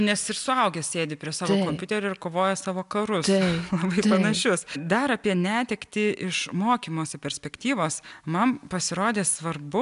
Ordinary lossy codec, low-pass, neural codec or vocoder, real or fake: AAC, 96 kbps; 10.8 kHz; none; real